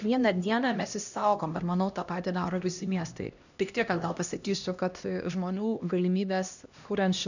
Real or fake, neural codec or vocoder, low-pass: fake; codec, 16 kHz, 1 kbps, X-Codec, HuBERT features, trained on LibriSpeech; 7.2 kHz